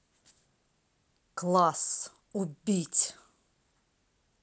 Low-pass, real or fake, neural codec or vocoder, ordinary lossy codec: none; real; none; none